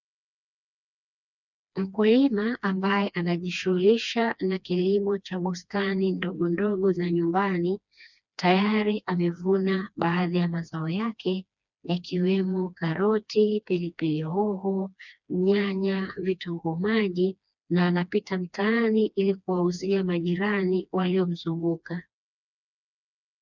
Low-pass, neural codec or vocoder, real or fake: 7.2 kHz; codec, 16 kHz, 2 kbps, FreqCodec, smaller model; fake